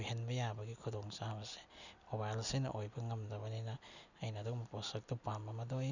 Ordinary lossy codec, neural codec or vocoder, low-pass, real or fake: none; none; 7.2 kHz; real